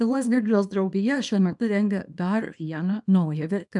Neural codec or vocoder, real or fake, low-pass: codec, 24 kHz, 0.9 kbps, WavTokenizer, small release; fake; 10.8 kHz